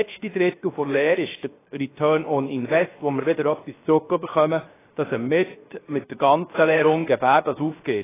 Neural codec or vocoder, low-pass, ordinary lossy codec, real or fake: codec, 16 kHz, 0.7 kbps, FocalCodec; 3.6 kHz; AAC, 16 kbps; fake